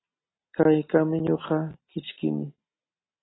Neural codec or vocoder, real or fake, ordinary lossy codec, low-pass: none; real; AAC, 16 kbps; 7.2 kHz